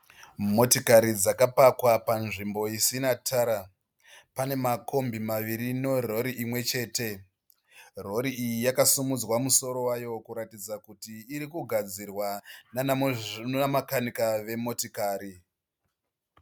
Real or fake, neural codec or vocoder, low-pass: real; none; 19.8 kHz